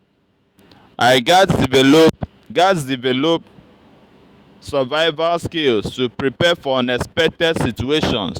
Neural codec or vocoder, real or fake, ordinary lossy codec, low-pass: autoencoder, 48 kHz, 128 numbers a frame, DAC-VAE, trained on Japanese speech; fake; Opus, 64 kbps; 19.8 kHz